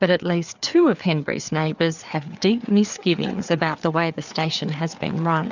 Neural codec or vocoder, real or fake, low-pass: codec, 16 kHz, 4 kbps, FreqCodec, larger model; fake; 7.2 kHz